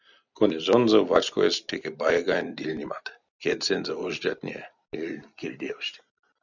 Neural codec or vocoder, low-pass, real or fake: none; 7.2 kHz; real